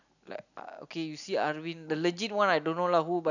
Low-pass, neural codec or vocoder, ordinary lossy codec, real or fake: 7.2 kHz; none; none; real